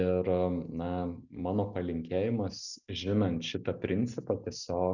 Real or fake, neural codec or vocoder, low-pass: real; none; 7.2 kHz